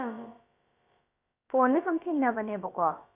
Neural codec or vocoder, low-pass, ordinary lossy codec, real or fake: codec, 16 kHz, about 1 kbps, DyCAST, with the encoder's durations; 3.6 kHz; AAC, 24 kbps; fake